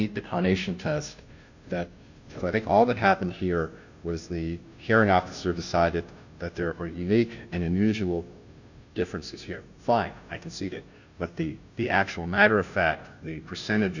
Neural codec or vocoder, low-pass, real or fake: codec, 16 kHz, 0.5 kbps, FunCodec, trained on Chinese and English, 25 frames a second; 7.2 kHz; fake